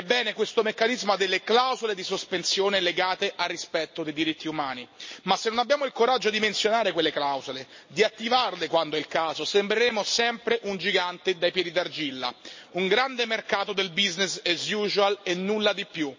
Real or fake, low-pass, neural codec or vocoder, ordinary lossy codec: real; 7.2 kHz; none; MP3, 32 kbps